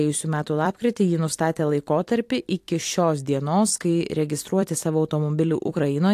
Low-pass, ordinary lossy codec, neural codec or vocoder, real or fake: 14.4 kHz; AAC, 64 kbps; vocoder, 44.1 kHz, 128 mel bands every 256 samples, BigVGAN v2; fake